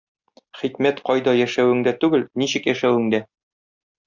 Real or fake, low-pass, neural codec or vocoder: real; 7.2 kHz; none